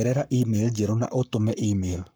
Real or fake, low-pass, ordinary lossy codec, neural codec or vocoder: fake; none; none; codec, 44.1 kHz, 7.8 kbps, Pupu-Codec